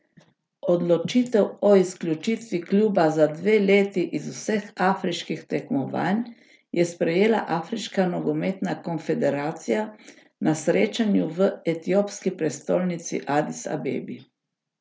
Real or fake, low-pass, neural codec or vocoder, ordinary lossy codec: real; none; none; none